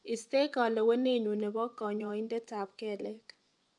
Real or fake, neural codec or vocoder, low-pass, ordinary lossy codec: fake; vocoder, 24 kHz, 100 mel bands, Vocos; 10.8 kHz; none